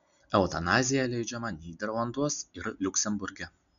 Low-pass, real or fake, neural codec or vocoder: 7.2 kHz; real; none